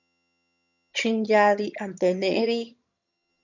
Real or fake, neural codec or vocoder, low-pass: fake; vocoder, 22.05 kHz, 80 mel bands, HiFi-GAN; 7.2 kHz